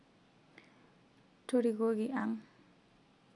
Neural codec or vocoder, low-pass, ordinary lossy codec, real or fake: none; 10.8 kHz; none; real